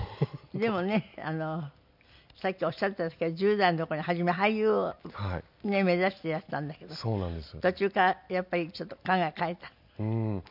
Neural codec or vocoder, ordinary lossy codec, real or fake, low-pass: none; none; real; 5.4 kHz